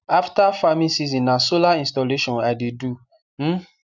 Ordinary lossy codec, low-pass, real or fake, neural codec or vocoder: none; 7.2 kHz; real; none